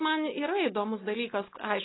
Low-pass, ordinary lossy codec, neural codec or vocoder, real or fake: 7.2 kHz; AAC, 16 kbps; none; real